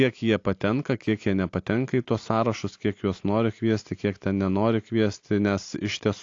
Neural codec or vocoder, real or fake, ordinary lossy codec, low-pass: none; real; AAC, 48 kbps; 7.2 kHz